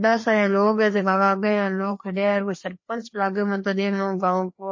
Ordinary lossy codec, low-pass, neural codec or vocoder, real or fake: MP3, 32 kbps; 7.2 kHz; codec, 16 kHz, 2 kbps, FreqCodec, larger model; fake